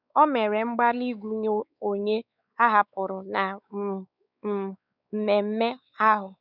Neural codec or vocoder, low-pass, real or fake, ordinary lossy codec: codec, 16 kHz, 4 kbps, X-Codec, WavLM features, trained on Multilingual LibriSpeech; 5.4 kHz; fake; none